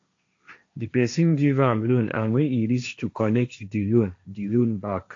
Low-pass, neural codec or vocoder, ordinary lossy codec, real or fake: 7.2 kHz; codec, 16 kHz, 1.1 kbps, Voila-Tokenizer; AAC, 48 kbps; fake